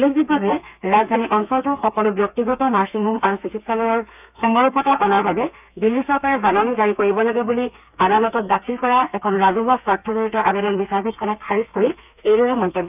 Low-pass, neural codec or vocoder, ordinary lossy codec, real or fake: 3.6 kHz; codec, 32 kHz, 1.9 kbps, SNAC; none; fake